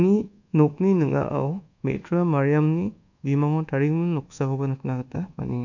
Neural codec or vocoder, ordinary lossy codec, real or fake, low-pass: codec, 24 kHz, 1.2 kbps, DualCodec; none; fake; 7.2 kHz